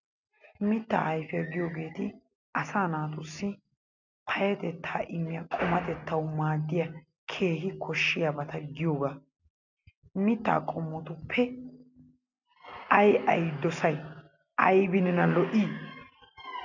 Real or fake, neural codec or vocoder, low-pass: real; none; 7.2 kHz